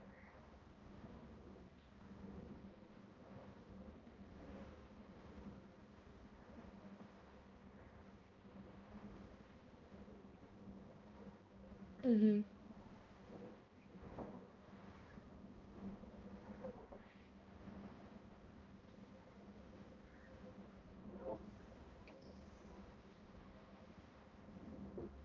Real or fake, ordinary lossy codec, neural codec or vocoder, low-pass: fake; Opus, 32 kbps; codec, 16 kHz, 1 kbps, X-Codec, HuBERT features, trained on balanced general audio; 7.2 kHz